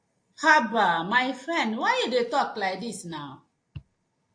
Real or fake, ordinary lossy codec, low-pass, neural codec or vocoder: real; AAC, 48 kbps; 9.9 kHz; none